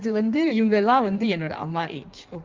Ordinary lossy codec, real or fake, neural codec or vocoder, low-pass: Opus, 32 kbps; fake; codec, 16 kHz in and 24 kHz out, 1.1 kbps, FireRedTTS-2 codec; 7.2 kHz